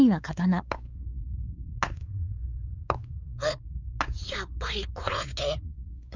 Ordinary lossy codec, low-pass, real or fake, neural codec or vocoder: none; 7.2 kHz; fake; codec, 16 kHz, 4 kbps, X-Codec, WavLM features, trained on Multilingual LibriSpeech